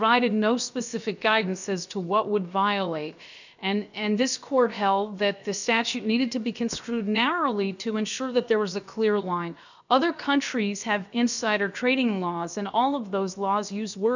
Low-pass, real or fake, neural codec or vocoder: 7.2 kHz; fake; codec, 16 kHz, about 1 kbps, DyCAST, with the encoder's durations